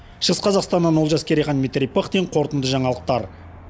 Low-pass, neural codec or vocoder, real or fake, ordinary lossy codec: none; none; real; none